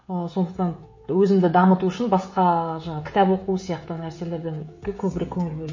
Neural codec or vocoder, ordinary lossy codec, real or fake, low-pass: codec, 16 kHz, 16 kbps, FreqCodec, smaller model; MP3, 32 kbps; fake; 7.2 kHz